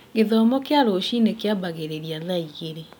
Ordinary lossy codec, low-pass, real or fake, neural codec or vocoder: none; 19.8 kHz; real; none